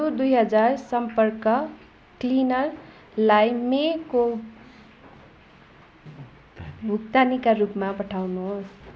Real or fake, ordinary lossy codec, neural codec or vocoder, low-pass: real; none; none; none